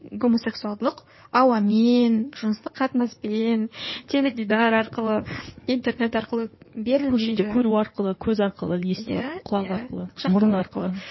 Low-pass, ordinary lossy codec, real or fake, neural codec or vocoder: 7.2 kHz; MP3, 24 kbps; fake; codec, 16 kHz in and 24 kHz out, 2.2 kbps, FireRedTTS-2 codec